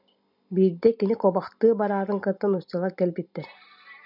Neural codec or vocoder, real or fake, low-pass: none; real; 5.4 kHz